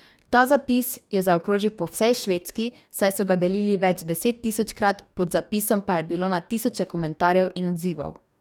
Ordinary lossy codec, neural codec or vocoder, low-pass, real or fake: none; codec, 44.1 kHz, 2.6 kbps, DAC; 19.8 kHz; fake